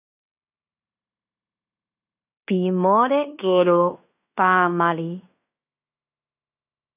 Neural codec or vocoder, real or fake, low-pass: codec, 16 kHz in and 24 kHz out, 0.9 kbps, LongCat-Audio-Codec, fine tuned four codebook decoder; fake; 3.6 kHz